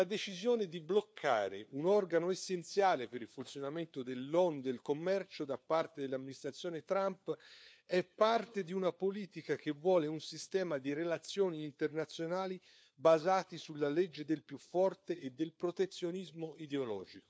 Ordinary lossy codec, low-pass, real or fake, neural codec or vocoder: none; none; fake; codec, 16 kHz, 4 kbps, FunCodec, trained on LibriTTS, 50 frames a second